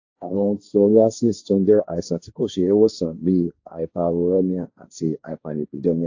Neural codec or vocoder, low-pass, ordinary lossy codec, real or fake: codec, 16 kHz, 1.1 kbps, Voila-Tokenizer; 7.2 kHz; AAC, 48 kbps; fake